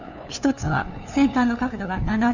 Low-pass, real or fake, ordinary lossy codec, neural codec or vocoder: 7.2 kHz; fake; none; codec, 16 kHz, 2 kbps, FunCodec, trained on LibriTTS, 25 frames a second